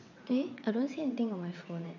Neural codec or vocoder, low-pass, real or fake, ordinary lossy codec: vocoder, 22.05 kHz, 80 mel bands, WaveNeXt; 7.2 kHz; fake; none